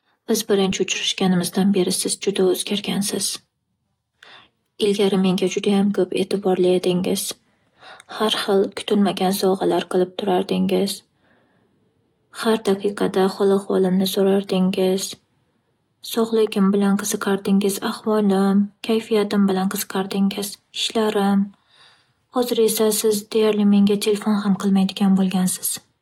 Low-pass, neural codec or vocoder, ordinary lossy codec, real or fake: 19.8 kHz; none; AAC, 48 kbps; real